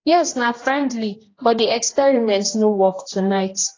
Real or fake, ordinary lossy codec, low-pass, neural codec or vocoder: fake; AAC, 32 kbps; 7.2 kHz; codec, 16 kHz, 1 kbps, X-Codec, HuBERT features, trained on general audio